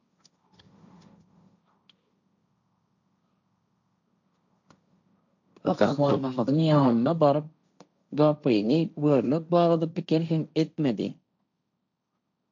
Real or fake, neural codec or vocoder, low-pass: fake; codec, 16 kHz, 1.1 kbps, Voila-Tokenizer; 7.2 kHz